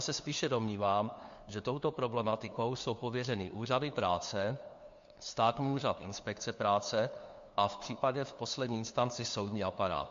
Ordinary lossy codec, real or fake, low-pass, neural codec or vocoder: MP3, 48 kbps; fake; 7.2 kHz; codec, 16 kHz, 2 kbps, FunCodec, trained on LibriTTS, 25 frames a second